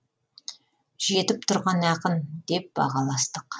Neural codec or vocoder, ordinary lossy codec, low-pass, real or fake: none; none; none; real